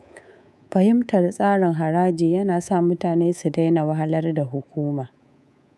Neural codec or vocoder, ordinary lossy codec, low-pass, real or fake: codec, 24 kHz, 3.1 kbps, DualCodec; none; none; fake